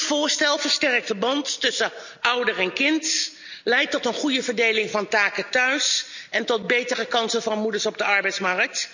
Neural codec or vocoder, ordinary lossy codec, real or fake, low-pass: none; none; real; 7.2 kHz